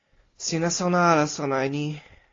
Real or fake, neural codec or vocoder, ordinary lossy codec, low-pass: real; none; AAC, 32 kbps; 7.2 kHz